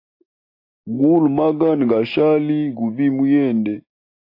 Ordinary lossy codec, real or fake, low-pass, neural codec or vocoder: AAC, 32 kbps; real; 5.4 kHz; none